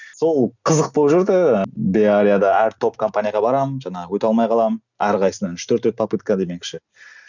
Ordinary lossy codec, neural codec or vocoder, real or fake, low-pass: none; none; real; 7.2 kHz